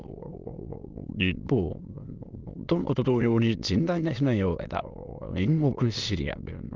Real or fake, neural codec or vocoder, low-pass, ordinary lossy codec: fake; autoencoder, 22.05 kHz, a latent of 192 numbers a frame, VITS, trained on many speakers; 7.2 kHz; Opus, 24 kbps